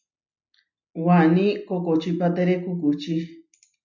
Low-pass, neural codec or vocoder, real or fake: 7.2 kHz; none; real